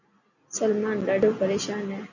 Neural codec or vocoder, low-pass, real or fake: none; 7.2 kHz; real